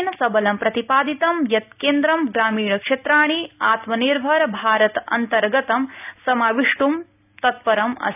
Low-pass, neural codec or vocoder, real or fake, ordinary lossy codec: 3.6 kHz; none; real; none